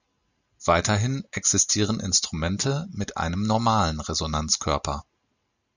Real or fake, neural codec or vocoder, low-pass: real; none; 7.2 kHz